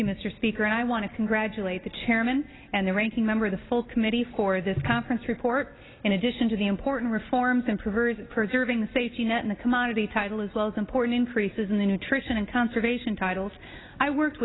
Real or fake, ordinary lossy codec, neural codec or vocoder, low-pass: real; AAC, 16 kbps; none; 7.2 kHz